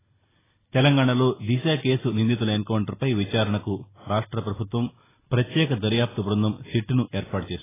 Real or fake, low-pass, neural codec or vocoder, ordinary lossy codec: real; 3.6 kHz; none; AAC, 16 kbps